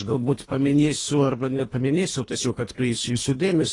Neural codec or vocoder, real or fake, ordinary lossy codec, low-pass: codec, 24 kHz, 1.5 kbps, HILCodec; fake; AAC, 32 kbps; 10.8 kHz